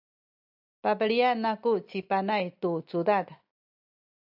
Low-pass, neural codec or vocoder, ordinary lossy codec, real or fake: 5.4 kHz; none; AAC, 48 kbps; real